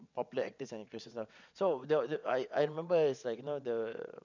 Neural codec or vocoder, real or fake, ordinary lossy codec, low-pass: vocoder, 22.05 kHz, 80 mel bands, WaveNeXt; fake; none; 7.2 kHz